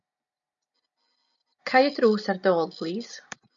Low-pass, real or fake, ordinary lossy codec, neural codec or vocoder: 7.2 kHz; real; MP3, 64 kbps; none